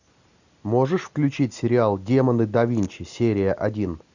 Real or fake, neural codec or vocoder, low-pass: real; none; 7.2 kHz